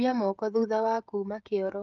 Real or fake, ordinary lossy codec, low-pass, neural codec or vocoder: fake; Opus, 32 kbps; 7.2 kHz; codec, 16 kHz, 16 kbps, FreqCodec, smaller model